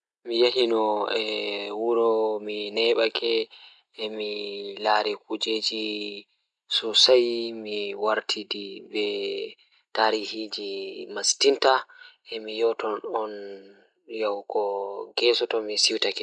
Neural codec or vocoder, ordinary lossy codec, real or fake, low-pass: none; none; real; 9.9 kHz